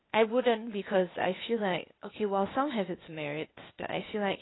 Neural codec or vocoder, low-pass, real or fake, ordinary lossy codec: codec, 16 kHz, 0.8 kbps, ZipCodec; 7.2 kHz; fake; AAC, 16 kbps